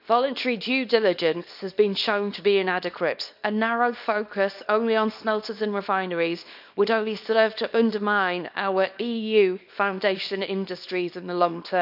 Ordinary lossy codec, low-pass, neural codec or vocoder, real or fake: none; 5.4 kHz; codec, 24 kHz, 0.9 kbps, WavTokenizer, small release; fake